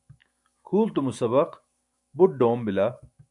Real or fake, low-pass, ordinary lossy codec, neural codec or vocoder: fake; 10.8 kHz; MP3, 64 kbps; autoencoder, 48 kHz, 128 numbers a frame, DAC-VAE, trained on Japanese speech